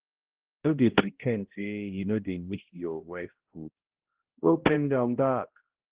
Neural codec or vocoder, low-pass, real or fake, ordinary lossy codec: codec, 16 kHz, 0.5 kbps, X-Codec, HuBERT features, trained on balanced general audio; 3.6 kHz; fake; Opus, 16 kbps